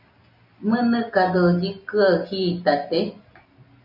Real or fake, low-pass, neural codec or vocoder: real; 5.4 kHz; none